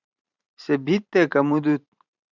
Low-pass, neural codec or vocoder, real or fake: 7.2 kHz; vocoder, 44.1 kHz, 128 mel bands every 512 samples, BigVGAN v2; fake